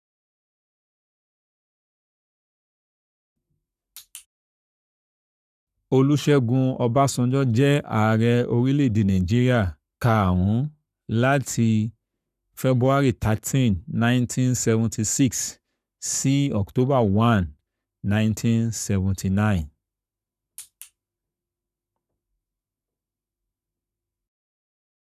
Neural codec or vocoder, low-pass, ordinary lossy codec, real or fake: codec, 44.1 kHz, 7.8 kbps, Pupu-Codec; 14.4 kHz; none; fake